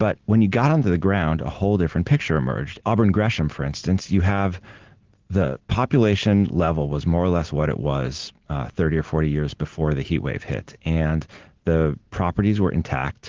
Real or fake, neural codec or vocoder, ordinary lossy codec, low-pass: real; none; Opus, 16 kbps; 7.2 kHz